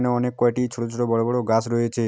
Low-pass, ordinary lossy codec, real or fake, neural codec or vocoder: none; none; real; none